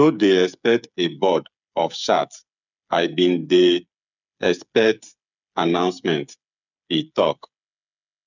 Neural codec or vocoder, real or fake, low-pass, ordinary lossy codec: codec, 16 kHz, 8 kbps, FreqCodec, smaller model; fake; 7.2 kHz; none